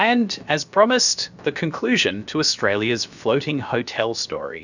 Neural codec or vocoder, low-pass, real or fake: codec, 16 kHz, about 1 kbps, DyCAST, with the encoder's durations; 7.2 kHz; fake